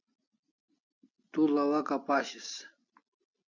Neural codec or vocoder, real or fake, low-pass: none; real; 7.2 kHz